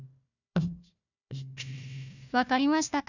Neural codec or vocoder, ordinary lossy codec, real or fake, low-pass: codec, 16 kHz, 1 kbps, FunCodec, trained on Chinese and English, 50 frames a second; none; fake; 7.2 kHz